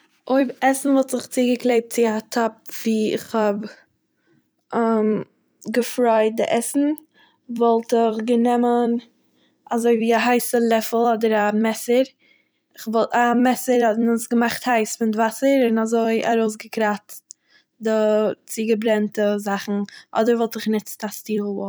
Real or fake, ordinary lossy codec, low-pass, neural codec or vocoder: fake; none; none; vocoder, 44.1 kHz, 128 mel bands every 256 samples, BigVGAN v2